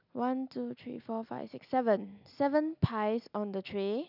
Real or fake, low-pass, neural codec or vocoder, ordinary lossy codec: real; 5.4 kHz; none; none